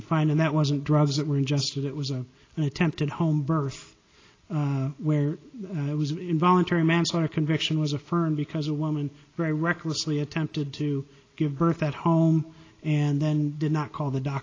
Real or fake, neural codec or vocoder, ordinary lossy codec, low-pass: real; none; AAC, 32 kbps; 7.2 kHz